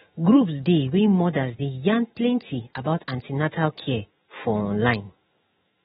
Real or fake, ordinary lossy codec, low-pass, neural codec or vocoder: fake; AAC, 16 kbps; 19.8 kHz; autoencoder, 48 kHz, 128 numbers a frame, DAC-VAE, trained on Japanese speech